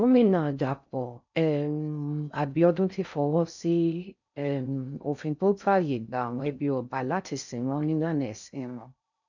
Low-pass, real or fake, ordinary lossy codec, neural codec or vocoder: 7.2 kHz; fake; none; codec, 16 kHz in and 24 kHz out, 0.6 kbps, FocalCodec, streaming, 4096 codes